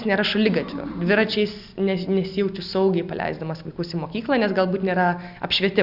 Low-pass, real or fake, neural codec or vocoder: 5.4 kHz; real; none